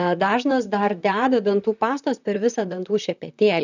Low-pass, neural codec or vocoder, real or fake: 7.2 kHz; vocoder, 44.1 kHz, 128 mel bands, Pupu-Vocoder; fake